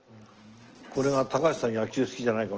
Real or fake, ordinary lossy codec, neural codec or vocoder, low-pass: real; Opus, 16 kbps; none; 7.2 kHz